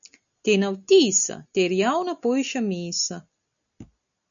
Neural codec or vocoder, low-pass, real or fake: none; 7.2 kHz; real